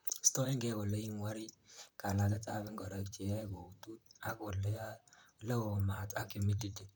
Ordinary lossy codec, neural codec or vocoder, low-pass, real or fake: none; vocoder, 44.1 kHz, 128 mel bands, Pupu-Vocoder; none; fake